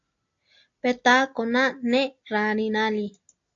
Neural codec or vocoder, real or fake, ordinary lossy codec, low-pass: none; real; AAC, 48 kbps; 7.2 kHz